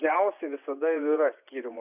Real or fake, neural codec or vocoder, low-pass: fake; vocoder, 24 kHz, 100 mel bands, Vocos; 3.6 kHz